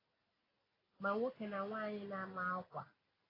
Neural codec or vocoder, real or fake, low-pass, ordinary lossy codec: none; real; 5.4 kHz; AAC, 24 kbps